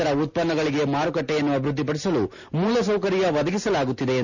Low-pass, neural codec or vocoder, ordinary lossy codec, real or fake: 7.2 kHz; none; none; real